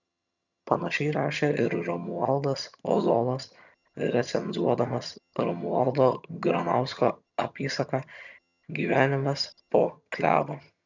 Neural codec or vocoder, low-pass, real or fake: vocoder, 22.05 kHz, 80 mel bands, HiFi-GAN; 7.2 kHz; fake